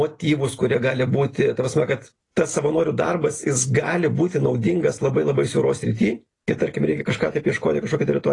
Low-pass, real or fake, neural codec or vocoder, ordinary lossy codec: 10.8 kHz; real; none; AAC, 32 kbps